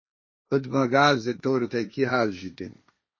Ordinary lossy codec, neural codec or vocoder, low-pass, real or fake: MP3, 32 kbps; codec, 16 kHz, 1.1 kbps, Voila-Tokenizer; 7.2 kHz; fake